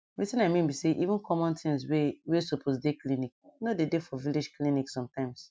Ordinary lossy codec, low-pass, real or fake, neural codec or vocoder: none; none; real; none